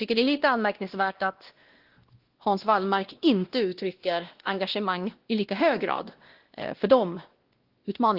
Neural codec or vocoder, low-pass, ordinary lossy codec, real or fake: codec, 16 kHz, 1 kbps, X-Codec, WavLM features, trained on Multilingual LibriSpeech; 5.4 kHz; Opus, 16 kbps; fake